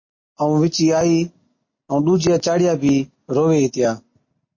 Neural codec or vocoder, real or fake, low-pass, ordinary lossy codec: none; real; 7.2 kHz; MP3, 32 kbps